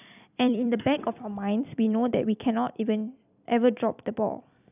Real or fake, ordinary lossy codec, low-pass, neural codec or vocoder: fake; none; 3.6 kHz; vocoder, 44.1 kHz, 128 mel bands every 256 samples, BigVGAN v2